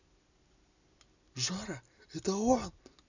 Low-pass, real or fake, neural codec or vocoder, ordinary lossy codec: 7.2 kHz; real; none; none